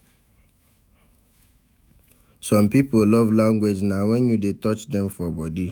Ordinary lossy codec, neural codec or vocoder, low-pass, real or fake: none; autoencoder, 48 kHz, 128 numbers a frame, DAC-VAE, trained on Japanese speech; none; fake